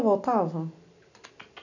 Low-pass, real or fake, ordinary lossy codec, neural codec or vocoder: 7.2 kHz; real; none; none